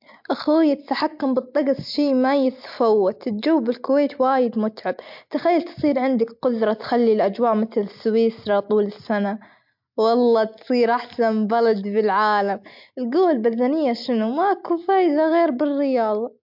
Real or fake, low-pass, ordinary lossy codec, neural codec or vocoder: real; 5.4 kHz; MP3, 48 kbps; none